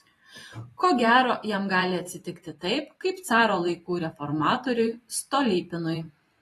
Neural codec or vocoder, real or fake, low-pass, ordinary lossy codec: vocoder, 44.1 kHz, 128 mel bands every 512 samples, BigVGAN v2; fake; 19.8 kHz; AAC, 32 kbps